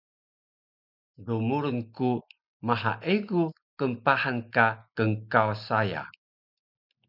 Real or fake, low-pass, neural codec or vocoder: real; 5.4 kHz; none